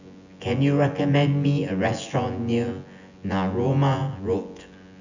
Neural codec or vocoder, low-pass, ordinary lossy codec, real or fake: vocoder, 24 kHz, 100 mel bands, Vocos; 7.2 kHz; none; fake